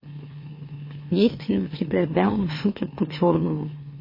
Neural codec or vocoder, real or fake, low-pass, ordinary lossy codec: autoencoder, 44.1 kHz, a latent of 192 numbers a frame, MeloTTS; fake; 5.4 kHz; MP3, 24 kbps